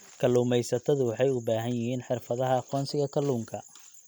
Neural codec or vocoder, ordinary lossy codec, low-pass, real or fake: none; none; none; real